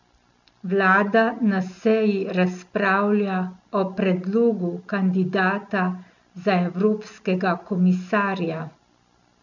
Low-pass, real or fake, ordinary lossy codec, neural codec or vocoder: 7.2 kHz; real; none; none